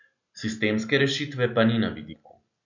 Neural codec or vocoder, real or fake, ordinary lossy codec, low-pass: none; real; none; 7.2 kHz